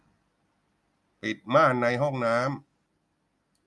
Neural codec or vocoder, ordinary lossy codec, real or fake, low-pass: none; none; real; none